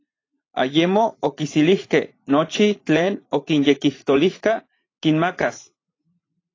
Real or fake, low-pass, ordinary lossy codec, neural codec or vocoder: fake; 7.2 kHz; AAC, 32 kbps; vocoder, 44.1 kHz, 80 mel bands, Vocos